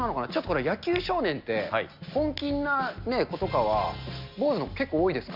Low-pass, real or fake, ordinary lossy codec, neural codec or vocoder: 5.4 kHz; real; none; none